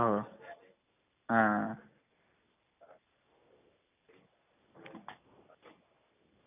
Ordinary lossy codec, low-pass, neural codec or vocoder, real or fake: none; 3.6 kHz; none; real